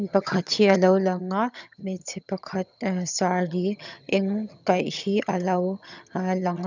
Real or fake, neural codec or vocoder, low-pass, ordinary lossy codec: fake; vocoder, 22.05 kHz, 80 mel bands, HiFi-GAN; 7.2 kHz; none